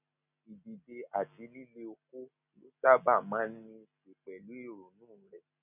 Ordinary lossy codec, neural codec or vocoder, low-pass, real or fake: none; none; 3.6 kHz; real